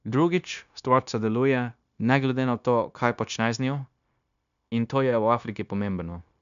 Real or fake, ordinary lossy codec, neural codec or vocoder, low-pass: fake; none; codec, 16 kHz, 0.9 kbps, LongCat-Audio-Codec; 7.2 kHz